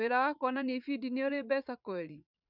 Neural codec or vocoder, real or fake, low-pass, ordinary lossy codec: vocoder, 44.1 kHz, 80 mel bands, Vocos; fake; 5.4 kHz; none